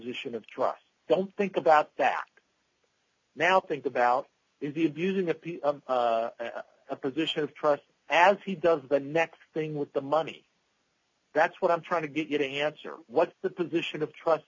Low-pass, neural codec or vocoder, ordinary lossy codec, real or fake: 7.2 kHz; none; MP3, 64 kbps; real